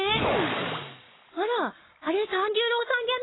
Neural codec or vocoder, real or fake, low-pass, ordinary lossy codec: codec, 44.1 kHz, 7.8 kbps, Pupu-Codec; fake; 7.2 kHz; AAC, 16 kbps